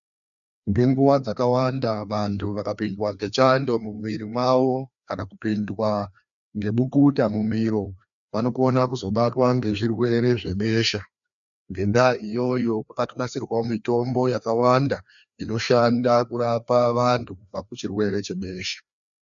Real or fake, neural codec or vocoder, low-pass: fake; codec, 16 kHz, 2 kbps, FreqCodec, larger model; 7.2 kHz